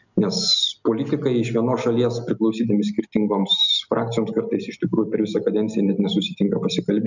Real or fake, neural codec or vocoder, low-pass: real; none; 7.2 kHz